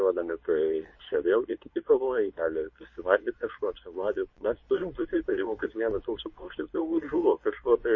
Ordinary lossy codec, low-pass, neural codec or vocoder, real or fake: MP3, 32 kbps; 7.2 kHz; codec, 24 kHz, 0.9 kbps, WavTokenizer, medium speech release version 2; fake